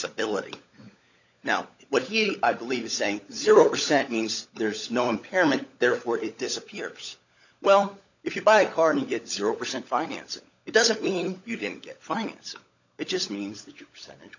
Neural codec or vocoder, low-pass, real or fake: codec, 16 kHz, 16 kbps, FunCodec, trained on LibriTTS, 50 frames a second; 7.2 kHz; fake